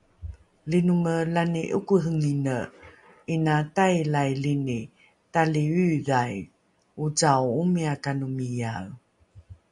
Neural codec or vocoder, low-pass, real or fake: none; 10.8 kHz; real